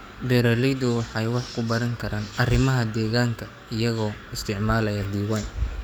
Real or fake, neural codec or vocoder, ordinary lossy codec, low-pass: fake; codec, 44.1 kHz, 7.8 kbps, DAC; none; none